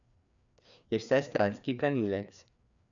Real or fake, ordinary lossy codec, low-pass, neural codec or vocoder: fake; none; 7.2 kHz; codec, 16 kHz, 2 kbps, FreqCodec, larger model